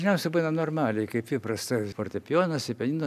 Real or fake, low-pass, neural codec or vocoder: real; 14.4 kHz; none